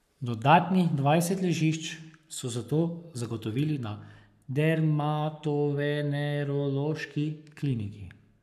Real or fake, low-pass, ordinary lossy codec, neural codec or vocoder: fake; 14.4 kHz; none; codec, 44.1 kHz, 7.8 kbps, Pupu-Codec